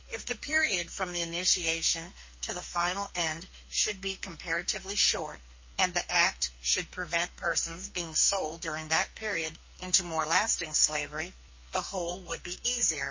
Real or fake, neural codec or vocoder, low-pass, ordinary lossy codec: fake; codec, 44.1 kHz, 2.6 kbps, SNAC; 7.2 kHz; MP3, 32 kbps